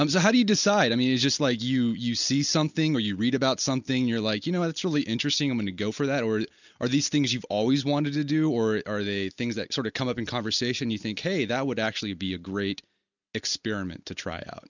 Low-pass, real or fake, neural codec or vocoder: 7.2 kHz; real; none